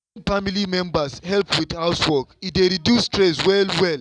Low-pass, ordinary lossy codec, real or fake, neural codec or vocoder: 9.9 kHz; none; real; none